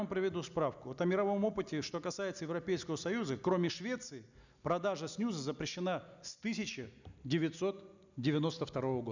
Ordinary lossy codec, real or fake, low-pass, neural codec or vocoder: none; real; 7.2 kHz; none